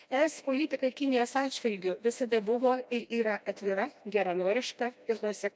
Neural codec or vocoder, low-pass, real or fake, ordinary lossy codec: codec, 16 kHz, 1 kbps, FreqCodec, smaller model; none; fake; none